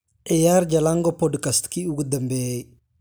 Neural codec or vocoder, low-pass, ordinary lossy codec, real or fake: none; none; none; real